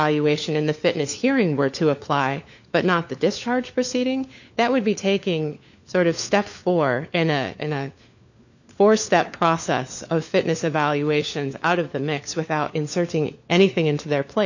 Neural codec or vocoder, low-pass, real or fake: codec, 16 kHz, 4 kbps, FunCodec, trained on LibriTTS, 50 frames a second; 7.2 kHz; fake